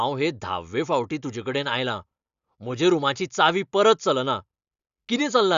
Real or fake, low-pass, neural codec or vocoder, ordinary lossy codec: real; 7.2 kHz; none; Opus, 64 kbps